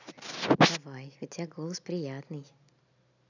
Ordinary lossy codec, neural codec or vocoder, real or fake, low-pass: none; none; real; 7.2 kHz